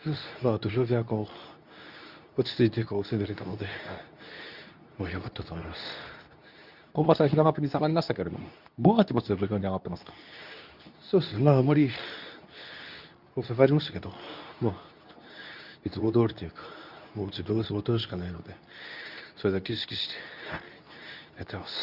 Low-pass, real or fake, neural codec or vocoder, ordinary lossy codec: 5.4 kHz; fake; codec, 24 kHz, 0.9 kbps, WavTokenizer, medium speech release version 2; none